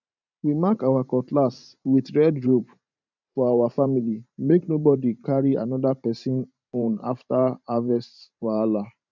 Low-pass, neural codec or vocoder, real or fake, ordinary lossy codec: 7.2 kHz; vocoder, 44.1 kHz, 128 mel bands every 512 samples, BigVGAN v2; fake; none